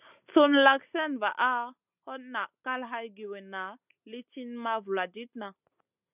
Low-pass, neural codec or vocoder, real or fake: 3.6 kHz; none; real